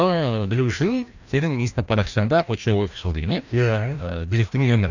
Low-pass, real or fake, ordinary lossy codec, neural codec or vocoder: 7.2 kHz; fake; none; codec, 16 kHz, 1 kbps, FreqCodec, larger model